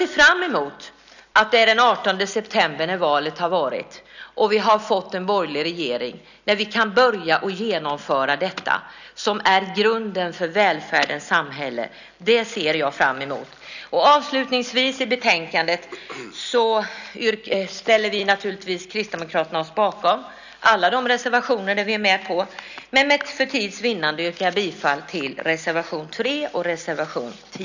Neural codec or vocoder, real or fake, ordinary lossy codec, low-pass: none; real; none; 7.2 kHz